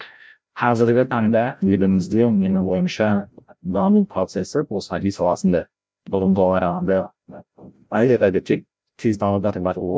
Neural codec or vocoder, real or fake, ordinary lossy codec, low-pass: codec, 16 kHz, 0.5 kbps, FreqCodec, larger model; fake; none; none